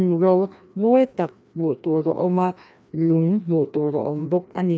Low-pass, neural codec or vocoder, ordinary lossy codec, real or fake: none; codec, 16 kHz, 1 kbps, FreqCodec, larger model; none; fake